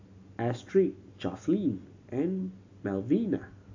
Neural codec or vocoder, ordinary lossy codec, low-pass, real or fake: none; AAC, 48 kbps; 7.2 kHz; real